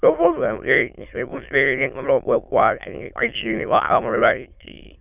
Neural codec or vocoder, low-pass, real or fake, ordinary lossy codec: autoencoder, 22.05 kHz, a latent of 192 numbers a frame, VITS, trained on many speakers; 3.6 kHz; fake; none